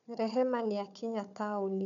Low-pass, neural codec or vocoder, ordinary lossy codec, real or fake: 7.2 kHz; codec, 16 kHz, 4 kbps, FunCodec, trained on Chinese and English, 50 frames a second; none; fake